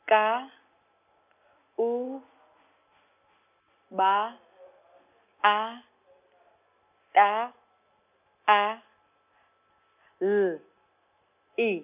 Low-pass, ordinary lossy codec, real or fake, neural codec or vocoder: 3.6 kHz; none; real; none